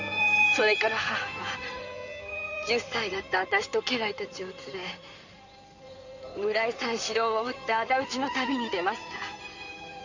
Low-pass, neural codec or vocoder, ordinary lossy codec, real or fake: 7.2 kHz; codec, 16 kHz in and 24 kHz out, 2.2 kbps, FireRedTTS-2 codec; none; fake